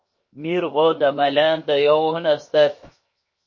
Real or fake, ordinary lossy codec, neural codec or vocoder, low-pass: fake; MP3, 32 kbps; codec, 16 kHz, 0.7 kbps, FocalCodec; 7.2 kHz